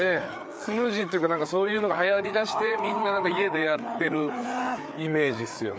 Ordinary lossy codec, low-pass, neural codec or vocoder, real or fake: none; none; codec, 16 kHz, 4 kbps, FreqCodec, larger model; fake